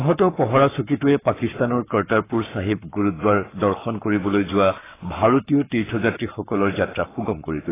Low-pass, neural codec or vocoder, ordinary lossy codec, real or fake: 3.6 kHz; codec, 44.1 kHz, 7.8 kbps, Pupu-Codec; AAC, 16 kbps; fake